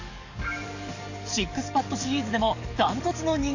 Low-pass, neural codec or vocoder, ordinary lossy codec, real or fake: 7.2 kHz; codec, 44.1 kHz, 7.8 kbps, DAC; none; fake